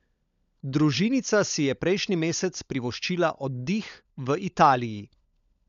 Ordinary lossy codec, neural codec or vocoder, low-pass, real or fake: none; codec, 16 kHz, 16 kbps, FunCodec, trained on LibriTTS, 50 frames a second; 7.2 kHz; fake